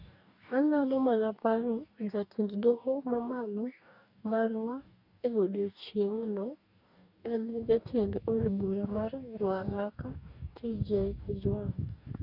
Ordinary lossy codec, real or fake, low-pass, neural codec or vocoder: none; fake; 5.4 kHz; codec, 44.1 kHz, 2.6 kbps, DAC